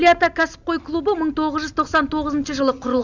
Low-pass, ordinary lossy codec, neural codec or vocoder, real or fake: 7.2 kHz; none; none; real